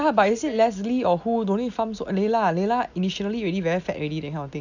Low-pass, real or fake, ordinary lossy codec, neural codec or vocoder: 7.2 kHz; real; none; none